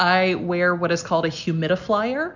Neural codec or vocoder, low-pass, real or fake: none; 7.2 kHz; real